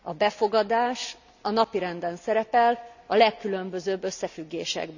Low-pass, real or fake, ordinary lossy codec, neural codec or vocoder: 7.2 kHz; real; none; none